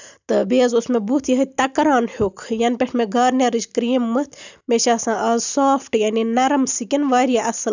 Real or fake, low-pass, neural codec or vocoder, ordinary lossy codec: real; 7.2 kHz; none; none